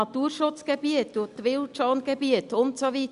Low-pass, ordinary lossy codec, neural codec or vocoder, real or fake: 10.8 kHz; Opus, 64 kbps; none; real